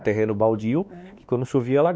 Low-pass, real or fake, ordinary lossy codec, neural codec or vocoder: none; fake; none; codec, 16 kHz, 2 kbps, X-Codec, WavLM features, trained on Multilingual LibriSpeech